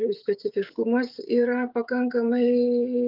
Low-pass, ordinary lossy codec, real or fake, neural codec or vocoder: 5.4 kHz; Opus, 24 kbps; fake; codec, 16 kHz, 16 kbps, FreqCodec, smaller model